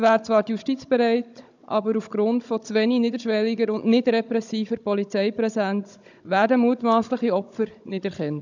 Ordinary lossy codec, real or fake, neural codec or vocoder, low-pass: none; fake; codec, 16 kHz, 16 kbps, FunCodec, trained on Chinese and English, 50 frames a second; 7.2 kHz